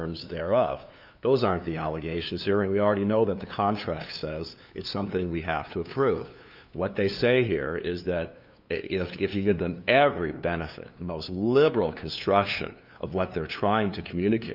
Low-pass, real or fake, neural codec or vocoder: 5.4 kHz; fake; codec, 16 kHz, 2 kbps, FunCodec, trained on LibriTTS, 25 frames a second